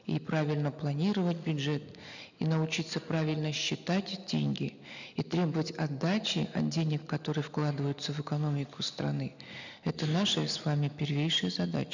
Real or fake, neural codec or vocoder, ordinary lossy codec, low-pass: real; none; none; 7.2 kHz